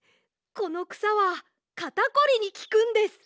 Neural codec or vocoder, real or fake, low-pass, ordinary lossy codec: none; real; none; none